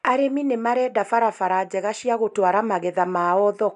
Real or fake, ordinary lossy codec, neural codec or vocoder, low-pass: real; none; none; 10.8 kHz